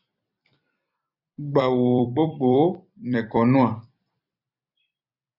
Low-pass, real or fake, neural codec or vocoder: 5.4 kHz; fake; vocoder, 44.1 kHz, 128 mel bands every 256 samples, BigVGAN v2